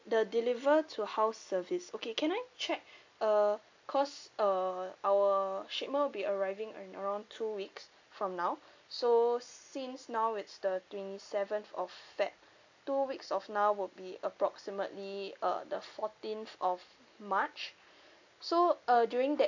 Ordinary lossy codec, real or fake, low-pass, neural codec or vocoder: AAC, 48 kbps; real; 7.2 kHz; none